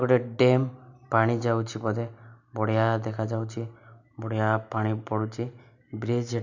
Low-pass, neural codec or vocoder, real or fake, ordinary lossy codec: 7.2 kHz; none; real; none